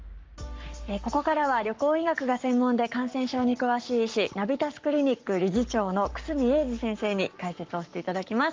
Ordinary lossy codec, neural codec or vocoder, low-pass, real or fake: Opus, 32 kbps; codec, 44.1 kHz, 7.8 kbps, Pupu-Codec; 7.2 kHz; fake